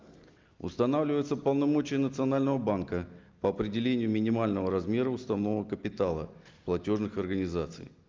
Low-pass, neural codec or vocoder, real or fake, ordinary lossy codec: 7.2 kHz; none; real; Opus, 24 kbps